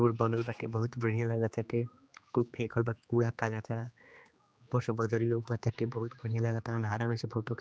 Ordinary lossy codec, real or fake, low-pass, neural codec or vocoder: none; fake; none; codec, 16 kHz, 2 kbps, X-Codec, HuBERT features, trained on general audio